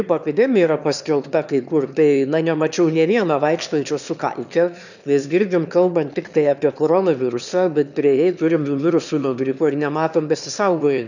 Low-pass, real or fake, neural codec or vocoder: 7.2 kHz; fake; autoencoder, 22.05 kHz, a latent of 192 numbers a frame, VITS, trained on one speaker